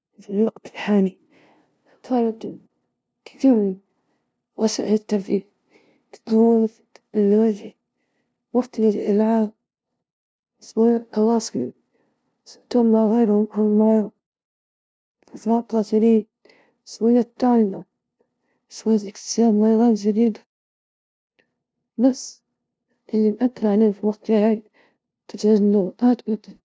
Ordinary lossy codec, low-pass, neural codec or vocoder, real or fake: none; none; codec, 16 kHz, 0.5 kbps, FunCodec, trained on LibriTTS, 25 frames a second; fake